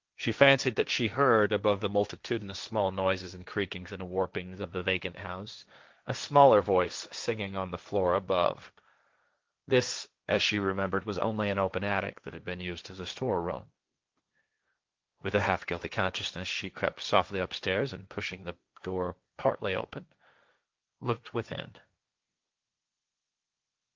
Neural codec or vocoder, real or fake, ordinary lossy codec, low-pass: codec, 16 kHz, 1.1 kbps, Voila-Tokenizer; fake; Opus, 24 kbps; 7.2 kHz